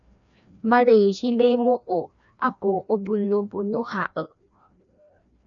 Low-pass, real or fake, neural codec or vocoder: 7.2 kHz; fake; codec, 16 kHz, 1 kbps, FreqCodec, larger model